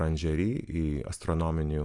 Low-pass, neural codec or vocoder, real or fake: 10.8 kHz; none; real